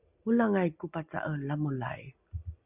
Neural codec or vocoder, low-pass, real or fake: none; 3.6 kHz; real